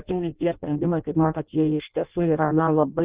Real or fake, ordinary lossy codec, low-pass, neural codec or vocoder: fake; Opus, 32 kbps; 3.6 kHz; codec, 16 kHz in and 24 kHz out, 0.6 kbps, FireRedTTS-2 codec